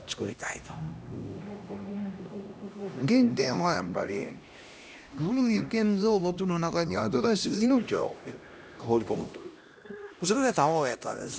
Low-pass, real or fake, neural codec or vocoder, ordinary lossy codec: none; fake; codec, 16 kHz, 1 kbps, X-Codec, HuBERT features, trained on LibriSpeech; none